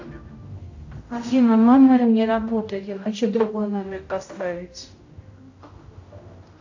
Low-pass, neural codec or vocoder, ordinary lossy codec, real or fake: 7.2 kHz; codec, 16 kHz, 0.5 kbps, X-Codec, HuBERT features, trained on general audio; AAC, 48 kbps; fake